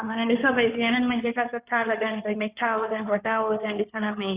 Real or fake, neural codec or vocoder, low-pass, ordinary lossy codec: fake; codec, 16 kHz in and 24 kHz out, 2.2 kbps, FireRedTTS-2 codec; 3.6 kHz; Opus, 64 kbps